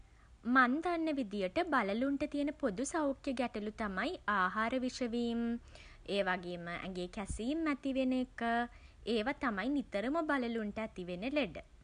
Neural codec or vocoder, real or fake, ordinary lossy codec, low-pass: none; real; none; 9.9 kHz